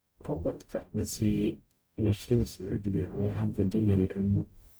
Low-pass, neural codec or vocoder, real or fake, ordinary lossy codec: none; codec, 44.1 kHz, 0.9 kbps, DAC; fake; none